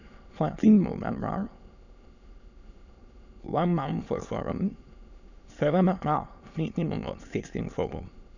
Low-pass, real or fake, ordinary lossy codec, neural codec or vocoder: 7.2 kHz; fake; none; autoencoder, 22.05 kHz, a latent of 192 numbers a frame, VITS, trained on many speakers